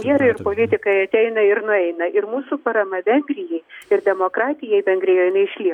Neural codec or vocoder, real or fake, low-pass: none; real; 19.8 kHz